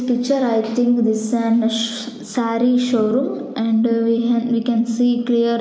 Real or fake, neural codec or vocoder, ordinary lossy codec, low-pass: real; none; none; none